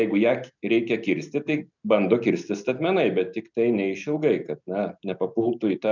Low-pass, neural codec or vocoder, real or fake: 7.2 kHz; none; real